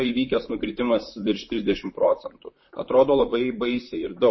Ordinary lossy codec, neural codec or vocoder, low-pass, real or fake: MP3, 24 kbps; vocoder, 44.1 kHz, 128 mel bands, Pupu-Vocoder; 7.2 kHz; fake